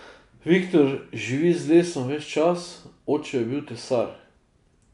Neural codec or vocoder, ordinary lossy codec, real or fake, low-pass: none; none; real; 10.8 kHz